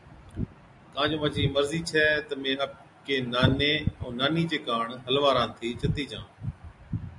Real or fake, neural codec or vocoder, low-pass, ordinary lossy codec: real; none; 10.8 kHz; AAC, 64 kbps